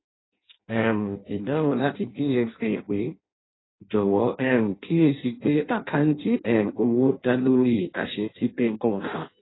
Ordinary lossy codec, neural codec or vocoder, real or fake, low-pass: AAC, 16 kbps; codec, 16 kHz in and 24 kHz out, 0.6 kbps, FireRedTTS-2 codec; fake; 7.2 kHz